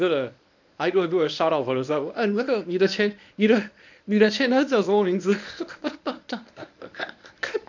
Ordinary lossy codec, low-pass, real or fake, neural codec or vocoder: none; 7.2 kHz; fake; codec, 24 kHz, 0.9 kbps, WavTokenizer, medium speech release version 1